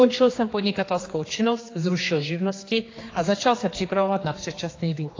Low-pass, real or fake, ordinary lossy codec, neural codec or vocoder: 7.2 kHz; fake; AAC, 32 kbps; codec, 16 kHz, 2 kbps, X-Codec, HuBERT features, trained on general audio